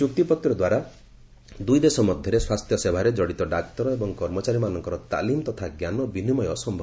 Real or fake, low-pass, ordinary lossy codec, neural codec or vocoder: real; none; none; none